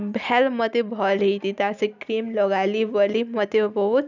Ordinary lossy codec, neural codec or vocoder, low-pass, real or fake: none; none; 7.2 kHz; real